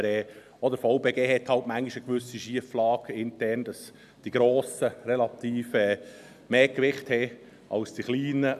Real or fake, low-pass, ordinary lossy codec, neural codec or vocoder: real; 14.4 kHz; none; none